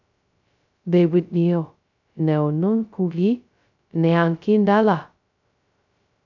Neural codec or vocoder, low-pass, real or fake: codec, 16 kHz, 0.2 kbps, FocalCodec; 7.2 kHz; fake